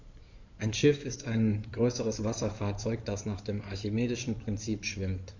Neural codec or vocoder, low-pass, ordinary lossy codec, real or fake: codec, 16 kHz in and 24 kHz out, 2.2 kbps, FireRedTTS-2 codec; 7.2 kHz; none; fake